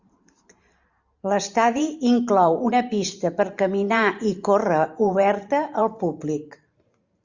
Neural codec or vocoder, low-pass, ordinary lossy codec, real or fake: vocoder, 44.1 kHz, 128 mel bands every 512 samples, BigVGAN v2; 7.2 kHz; Opus, 64 kbps; fake